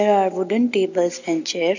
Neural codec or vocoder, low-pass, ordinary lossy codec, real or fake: none; 7.2 kHz; none; real